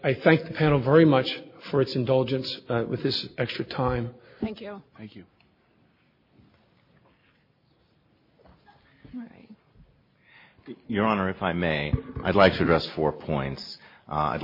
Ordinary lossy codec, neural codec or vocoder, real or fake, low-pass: MP3, 24 kbps; none; real; 5.4 kHz